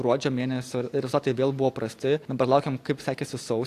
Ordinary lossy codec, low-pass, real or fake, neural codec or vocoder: AAC, 64 kbps; 14.4 kHz; real; none